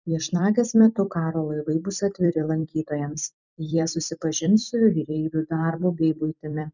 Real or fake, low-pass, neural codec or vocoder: fake; 7.2 kHz; vocoder, 44.1 kHz, 128 mel bands every 256 samples, BigVGAN v2